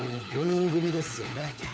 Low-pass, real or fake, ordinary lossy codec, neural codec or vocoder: none; fake; none; codec, 16 kHz, 4 kbps, FunCodec, trained on LibriTTS, 50 frames a second